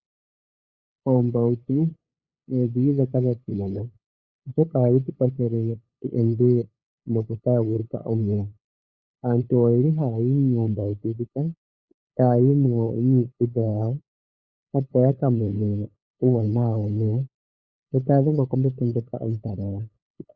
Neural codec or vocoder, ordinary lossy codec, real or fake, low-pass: codec, 16 kHz, 8 kbps, FunCodec, trained on LibriTTS, 25 frames a second; Opus, 64 kbps; fake; 7.2 kHz